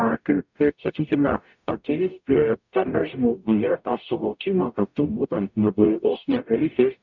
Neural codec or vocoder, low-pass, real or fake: codec, 44.1 kHz, 0.9 kbps, DAC; 7.2 kHz; fake